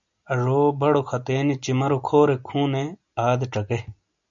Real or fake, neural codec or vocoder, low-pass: real; none; 7.2 kHz